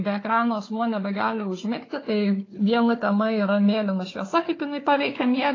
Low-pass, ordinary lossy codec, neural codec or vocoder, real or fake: 7.2 kHz; AAC, 32 kbps; codec, 16 kHz, 4 kbps, FunCodec, trained on Chinese and English, 50 frames a second; fake